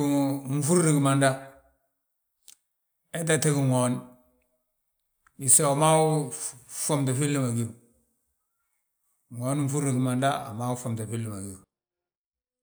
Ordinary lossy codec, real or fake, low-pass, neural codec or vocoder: none; real; none; none